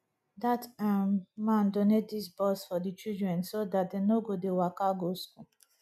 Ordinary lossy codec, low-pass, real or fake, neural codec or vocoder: none; 14.4 kHz; real; none